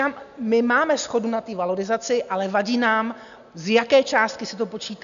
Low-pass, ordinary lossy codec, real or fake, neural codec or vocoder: 7.2 kHz; AAC, 96 kbps; real; none